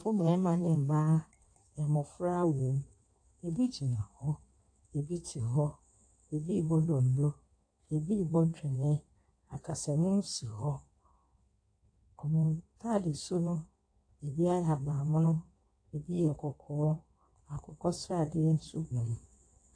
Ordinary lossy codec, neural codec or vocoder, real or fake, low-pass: AAC, 48 kbps; codec, 16 kHz in and 24 kHz out, 1.1 kbps, FireRedTTS-2 codec; fake; 9.9 kHz